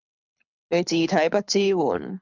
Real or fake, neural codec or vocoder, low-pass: fake; codec, 24 kHz, 6 kbps, HILCodec; 7.2 kHz